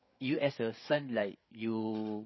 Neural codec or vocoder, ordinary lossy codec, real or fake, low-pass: codec, 16 kHz, 8 kbps, FreqCodec, larger model; MP3, 24 kbps; fake; 7.2 kHz